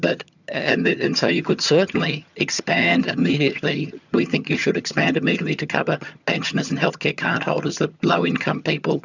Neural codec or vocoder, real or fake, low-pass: vocoder, 22.05 kHz, 80 mel bands, HiFi-GAN; fake; 7.2 kHz